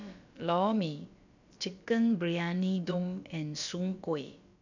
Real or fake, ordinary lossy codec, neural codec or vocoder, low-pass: fake; none; codec, 16 kHz, about 1 kbps, DyCAST, with the encoder's durations; 7.2 kHz